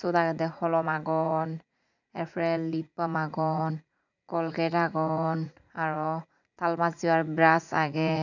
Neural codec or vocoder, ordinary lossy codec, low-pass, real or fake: vocoder, 44.1 kHz, 80 mel bands, Vocos; none; 7.2 kHz; fake